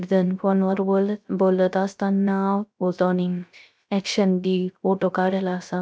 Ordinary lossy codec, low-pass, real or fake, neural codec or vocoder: none; none; fake; codec, 16 kHz, 0.3 kbps, FocalCodec